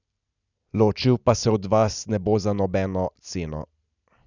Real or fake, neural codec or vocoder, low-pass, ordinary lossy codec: real; none; 7.2 kHz; none